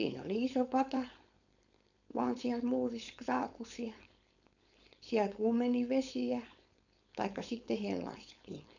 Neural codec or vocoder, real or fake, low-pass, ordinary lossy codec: codec, 16 kHz, 4.8 kbps, FACodec; fake; 7.2 kHz; none